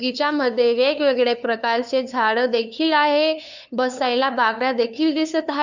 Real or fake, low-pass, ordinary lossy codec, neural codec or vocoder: fake; 7.2 kHz; none; codec, 16 kHz, 2 kbps, FunCodec, trained on LibriTTS, 25 frames a second